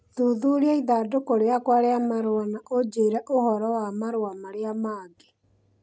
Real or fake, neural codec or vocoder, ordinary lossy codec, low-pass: real; none; none; none